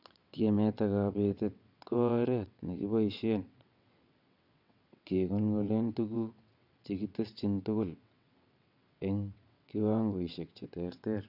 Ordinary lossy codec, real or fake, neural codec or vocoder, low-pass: none; fake; vocoder, 22.05 kHz, 80 mel bands, WaveNeXt; 5.4 kHz